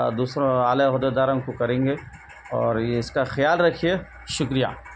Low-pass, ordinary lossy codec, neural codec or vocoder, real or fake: none; none; none; real